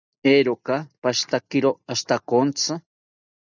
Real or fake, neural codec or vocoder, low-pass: real; none; 7.2 kHz